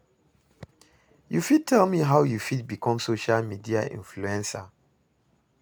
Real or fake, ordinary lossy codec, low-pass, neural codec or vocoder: real; none; none; none